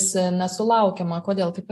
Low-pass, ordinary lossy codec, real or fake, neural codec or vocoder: 14.4 kHz; AAC, 64 kbps; real; none